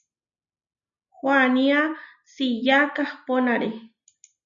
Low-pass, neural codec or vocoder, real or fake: 7.2 kHz; none; real